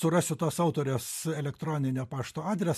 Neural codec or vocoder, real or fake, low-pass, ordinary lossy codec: none; real; 14.4 kHz; MP3, 64 kbps